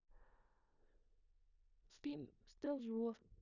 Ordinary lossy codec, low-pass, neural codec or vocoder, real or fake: none; 7.2 kHz; codec, 16 kHz in and 24 kHz out, 0.4 kbps, LongCat-Audio-Codec, four codebook decoder; fake